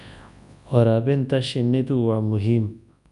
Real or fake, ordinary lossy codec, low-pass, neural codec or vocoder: fake; none; 10.8 kHz; codec, 24 kHz, 0.9 kbps, WavTokenizer, large speech release